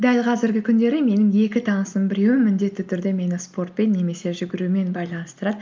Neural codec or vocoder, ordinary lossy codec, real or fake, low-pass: none; Opus, 24 kbps; real; 7.2 kHz